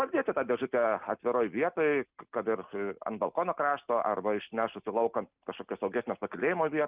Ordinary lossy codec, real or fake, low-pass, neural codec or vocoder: Opus, 24 kbps; real; 3.6 kHz; none